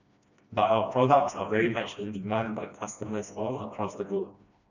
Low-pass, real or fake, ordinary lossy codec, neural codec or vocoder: 7.2 kHz; fake; none; codec, 16 kHz, 1 kbps, FreqCodec, smaller model